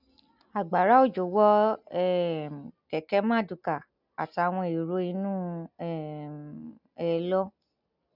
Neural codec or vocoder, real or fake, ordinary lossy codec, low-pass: none; real; none; 5.4 kHz